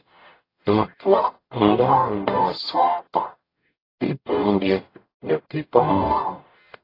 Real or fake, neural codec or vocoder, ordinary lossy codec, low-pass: fake; codec, 44.1 kHz, 0.9 kbps, DAC; AAC, 24 kbps; 5.4 kHz